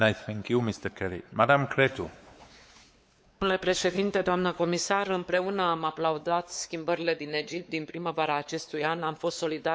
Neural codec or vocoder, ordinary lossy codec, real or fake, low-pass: codec, 16 kHz, 4 kbps, X-Codec, WavLM features, trained on Multilingual LibriSpeech; none; fake; none